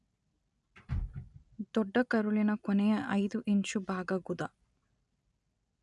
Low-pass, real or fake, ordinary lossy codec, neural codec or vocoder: 10.8 kHz; real; none; none